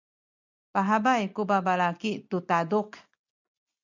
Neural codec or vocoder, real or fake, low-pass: none; real; 7.2 kHz